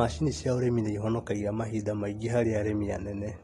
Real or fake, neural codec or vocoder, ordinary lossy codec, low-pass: real; none; AAC, 32 kbps; 19.8 kHz